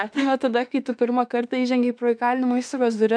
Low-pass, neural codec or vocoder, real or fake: 9.9 kHz; autoencoder, 48 kHz, 32 numbers a frame, DAC-VAE, trained on Japanese speech; fake